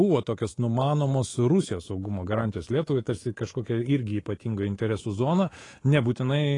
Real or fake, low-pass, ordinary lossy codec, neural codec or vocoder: fake; 10.8 kHz; AAC, 32 kbps; codec, 24 kHz, 3.1 kbps, DualCodec